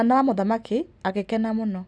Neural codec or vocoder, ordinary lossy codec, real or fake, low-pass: none; none; real; none